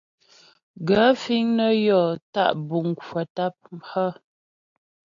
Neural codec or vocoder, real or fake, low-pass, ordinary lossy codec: none; real; 7.2 kHz; AAC, 64 kbps